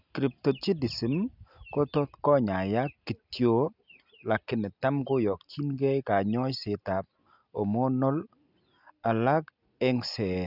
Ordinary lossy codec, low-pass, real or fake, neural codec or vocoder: none; 5.4 kHz; real; none